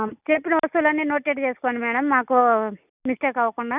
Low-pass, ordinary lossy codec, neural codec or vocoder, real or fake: 3.6 kHz; none; none; real